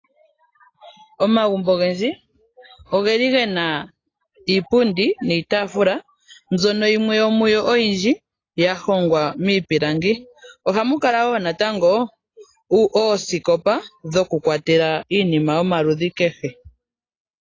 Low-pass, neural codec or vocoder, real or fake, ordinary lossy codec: 7.2 kHz; none; real; AAC, 32 kbps